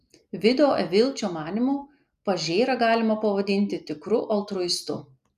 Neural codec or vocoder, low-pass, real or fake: none; 14.4 kHz; real